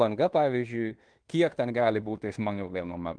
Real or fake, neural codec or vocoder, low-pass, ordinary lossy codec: fake; codec, 16 kHz in and 24 kHz out, 0.9 kbps, LongCat-Audio-Codec, fine tuned four codebook decoder; 9.9 kHz; Opus, 24 kbps